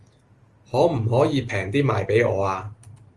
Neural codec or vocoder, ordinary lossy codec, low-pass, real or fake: none; Opus, 24 kbps; 10.8 kHz; real